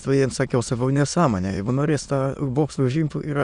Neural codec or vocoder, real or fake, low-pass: autoencoder, 22.05 kHz, a latent of 192 numbers a frame, VITS, trained on many speakers; fake; 9.9 kHz